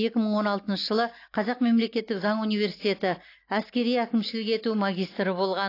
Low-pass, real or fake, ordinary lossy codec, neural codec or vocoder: 5.4 kHz; real; AAC, 32 kbps; none